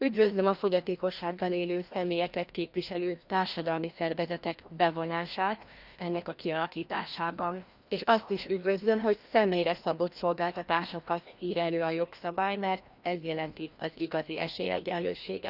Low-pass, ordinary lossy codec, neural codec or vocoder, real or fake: 5.4 kHz; Opus, 64 kbps; codec, 16 kHz, 1 kbps, FreqCodec, larger model; fake